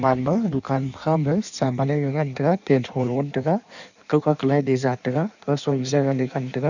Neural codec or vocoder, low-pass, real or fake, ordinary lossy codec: codec, 16 kHz in and 24 kHz out, 1.1 kbps, FireRedTTS-2 codec; 7.2 kHz; fake; none